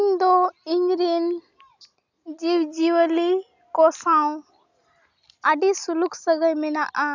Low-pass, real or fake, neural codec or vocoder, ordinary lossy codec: 7.2 kHz; real; none; none